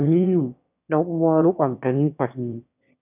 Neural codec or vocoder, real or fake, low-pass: autoencoder, 22.05 kHz, a latent of 192 numbers a frame, VITS, trained on one speaker; fake; 3.6 kHz